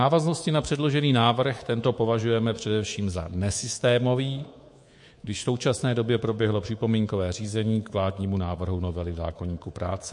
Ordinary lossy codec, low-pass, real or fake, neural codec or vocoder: MP3, 48 kbps; 10.8 kHz; fake; codec, 24 kHz, 3.1 kbps, DualCodec